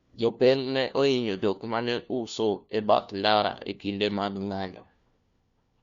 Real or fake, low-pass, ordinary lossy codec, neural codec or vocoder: fake; 7.2 kHz; Opus, 64 kbps; codec, 16 kHz, 1 kbps, FunCodec, trained on LibriTTS, 50 frames a second